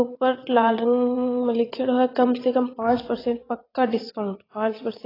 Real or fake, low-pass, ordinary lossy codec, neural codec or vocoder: fake; 5.4 kHz; AAC, 24 kbps; vocoder, 22.05 kHz, 80 mel bands, WaveNeXt